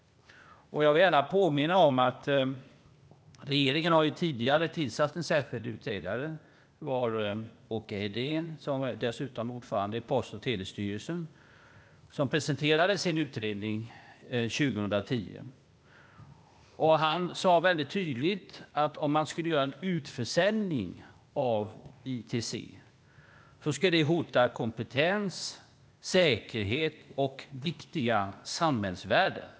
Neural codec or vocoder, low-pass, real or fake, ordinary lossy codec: codec, 16 kHz, 0.8 kbps, ZipCodec; none; fake; none